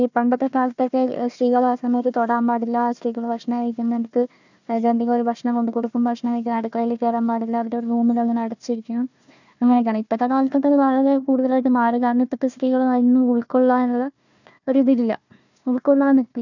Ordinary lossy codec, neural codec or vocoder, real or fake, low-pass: none; codec, 16 kHz, 1 kbps, FunCodec, trained on Chinese and English, 50 frames a second; fake; 7.2 kHz